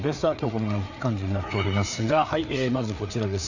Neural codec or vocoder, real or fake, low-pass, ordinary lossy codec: codec, 16 kHz, 4 kbps, FreqCodec, larger model; fake; 7.2 kHz; none